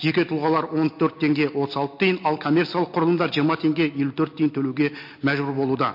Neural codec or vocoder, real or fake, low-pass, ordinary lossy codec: none; real; 5.4 kHz; MP3, 32 kbps